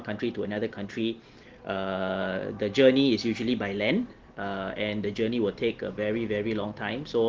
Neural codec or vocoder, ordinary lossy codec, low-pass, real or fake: none; Opus, 16 kbps; 7.2 kHz; real